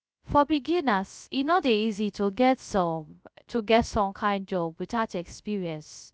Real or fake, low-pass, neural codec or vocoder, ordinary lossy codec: fake; none; codec, 16 kHz, 0.3 kbps, FocalCodec; none